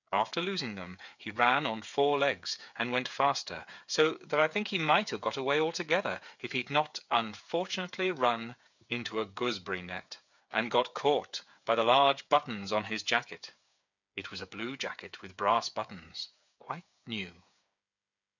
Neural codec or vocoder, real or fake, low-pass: codec, 16 kHz, 8 kbps, FreqCodec, smaller model; fake; 7.2 kHz